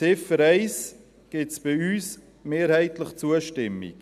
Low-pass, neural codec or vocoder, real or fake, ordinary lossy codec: 14.4 kHz; none; real; none